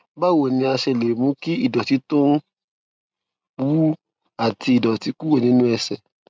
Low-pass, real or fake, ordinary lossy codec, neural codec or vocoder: none; real; none; none